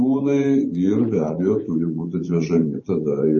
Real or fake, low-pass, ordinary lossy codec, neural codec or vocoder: fake; 10.8 kHz; MP3, 32 kbps; vocoder, 24 kHz, 100 mel bands, Vocos